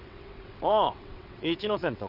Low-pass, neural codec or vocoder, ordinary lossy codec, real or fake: 5.4 kHz; vocoder, 22.05 kHz, 80 mel bands, WaveNeXt; none; fake